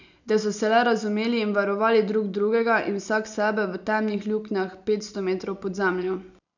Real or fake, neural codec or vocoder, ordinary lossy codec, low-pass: real; none; none; 7.2 kHz